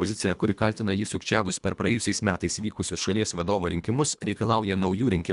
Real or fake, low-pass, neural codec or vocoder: fake; 10.8 kHz; codec, 24 kHz, 1.5 kbps, HILCodec